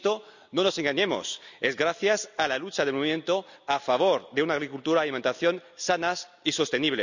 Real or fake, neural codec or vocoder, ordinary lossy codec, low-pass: real; none; none; 7.2 kHz